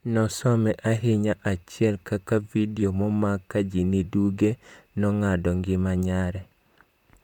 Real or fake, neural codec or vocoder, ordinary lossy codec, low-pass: fake; vocoder, 44.1 kHz, 128 mel bands, Pupu-Vocoder; none; 19.8 kHz